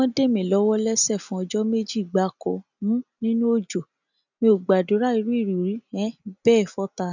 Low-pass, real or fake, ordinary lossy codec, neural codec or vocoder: 7.2 kHz; real; none; none